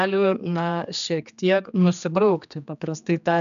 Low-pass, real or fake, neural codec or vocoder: 7.2 kHz; fake; codec, 16 kHz, 2 kbps, X-Codec, HuBERT features, trained on general audio